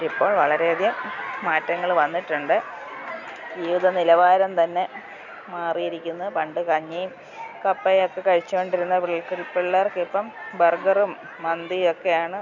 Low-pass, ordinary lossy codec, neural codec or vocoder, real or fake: 7.2 kHz; none; none; real